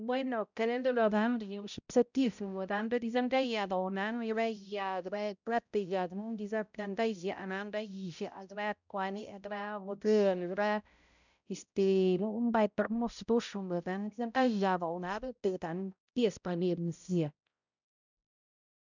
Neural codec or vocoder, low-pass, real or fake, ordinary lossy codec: codec, 16 kHz, 0.5 kbps, X-Codec, HuBERT features, trained on balanced general audio; 7.2 kHz; fake; none